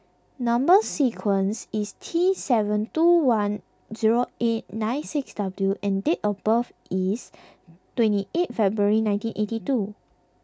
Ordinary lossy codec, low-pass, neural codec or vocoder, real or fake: none; none; none; real